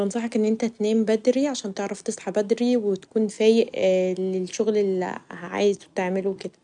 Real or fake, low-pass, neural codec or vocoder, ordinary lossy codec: real; 9.9 kHz; none; none